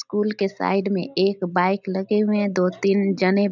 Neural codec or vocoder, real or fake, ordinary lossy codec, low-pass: none; real; none; 7.2 kHz